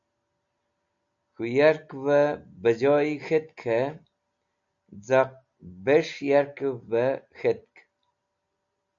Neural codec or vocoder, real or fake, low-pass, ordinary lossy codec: none; real; 7.2 kHz; AAC, 64 kbps